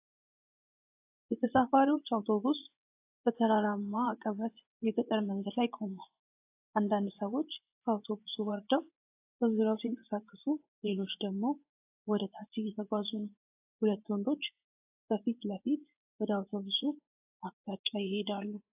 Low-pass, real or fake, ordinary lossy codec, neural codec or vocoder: 3.6 kHz; fake; AAC, 32 kbps; vocoder, 24 kHz, 100 mel bands, Vocos